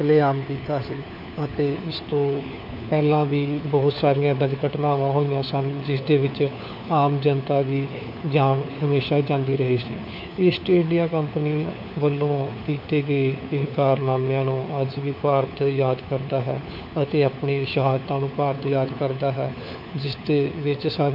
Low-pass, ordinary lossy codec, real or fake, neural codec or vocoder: 5.4 kHz; none; fake; codec, 16 kHz, 4 kbps, FunCodec, trained on LibriTTS, 50 frames a second